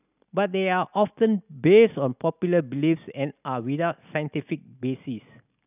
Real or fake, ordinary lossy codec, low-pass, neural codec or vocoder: real; none; 3.6 kHz; none